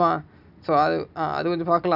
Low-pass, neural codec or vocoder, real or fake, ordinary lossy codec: 5.4 kHz; none; real; none